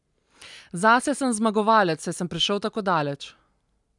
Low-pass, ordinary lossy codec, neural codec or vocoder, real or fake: 10.8 kHz; none; none; real